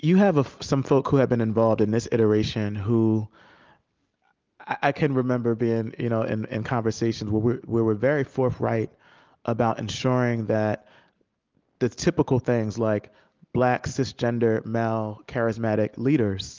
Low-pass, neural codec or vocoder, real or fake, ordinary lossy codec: 7.2 kHz; none; real; Opus, 32 kbps